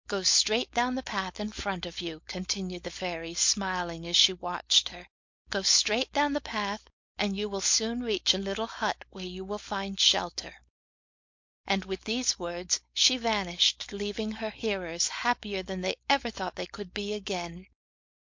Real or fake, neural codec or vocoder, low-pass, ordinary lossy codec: fake; codec, 16 kHz, 4.8 kbps, FACodec; 7.2 kHz; MP3, 48 kbps